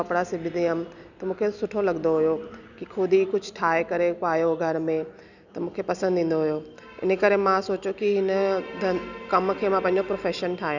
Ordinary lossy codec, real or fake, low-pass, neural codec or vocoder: none; real; 7.2 kHz; none